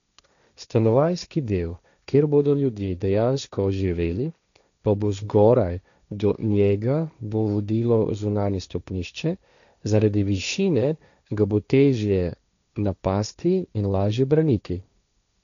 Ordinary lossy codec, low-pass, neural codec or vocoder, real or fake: none; 7.2 kHz; codec, 16 kHz, 1.1 kbps, Voila-Tokenizer; fake